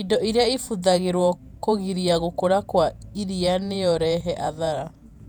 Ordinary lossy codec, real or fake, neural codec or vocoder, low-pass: none; fake; vocoder, 48 kHz, 128 mel bands, Vocos; 19.8 kHz